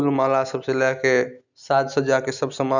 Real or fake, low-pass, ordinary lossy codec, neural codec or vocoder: real; 7.2 kHz; none; none